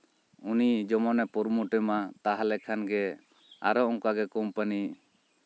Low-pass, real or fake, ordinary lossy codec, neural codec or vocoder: none; real; none; none